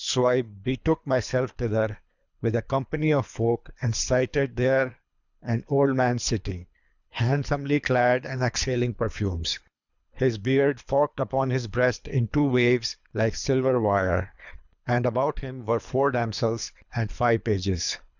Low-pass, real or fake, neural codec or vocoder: 7.2 kHz; fake; codec, 24 kHz, 3 kbps, HILCodec